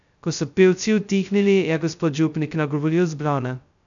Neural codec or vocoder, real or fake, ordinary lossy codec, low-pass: codec, 16 kHz, 0.2 kbps, FocalCodec; fake; none; 7.2 kHz